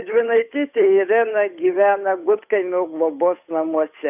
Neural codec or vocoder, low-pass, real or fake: codec, 16 kHz, 8 kbps, FunCodec, trained on Chinese and English, 25 frames a second; 3.6 kHz; fake